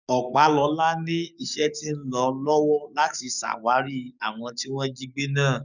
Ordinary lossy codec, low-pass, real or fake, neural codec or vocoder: none; 7.2 kHz; fake; codec, 44.1 kHz, 7.8 kbps, DAC